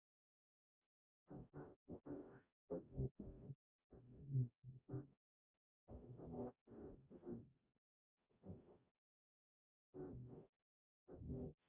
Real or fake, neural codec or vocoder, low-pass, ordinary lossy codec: fake; codec, 44.1 kHz, 0.9 kbps, DAC; 3.6 kHz; Opus, 64 kbps